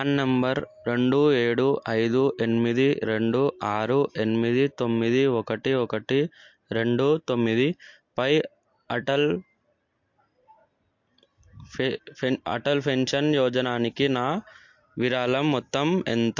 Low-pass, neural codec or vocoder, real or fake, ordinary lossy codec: 7.2 kHz; none; real; MP3, 48 kbps